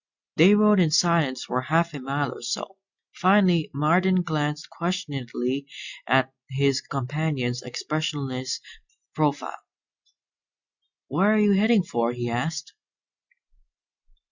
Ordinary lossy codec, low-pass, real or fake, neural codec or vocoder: Opus, 64 kbps; 7.2 kHz; real; none